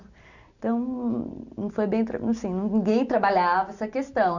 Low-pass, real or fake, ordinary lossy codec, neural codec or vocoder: 7.2 kHz; real; none; none